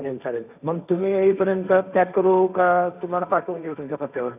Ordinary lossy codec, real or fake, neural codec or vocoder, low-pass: none; fake; codec, 16 kHz, 1.1 kbps, Voila-Tokenizer; 3.6 kHz